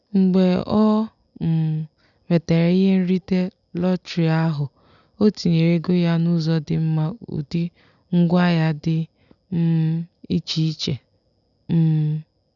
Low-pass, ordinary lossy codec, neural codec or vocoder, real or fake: 7.2 kHz; none; none; real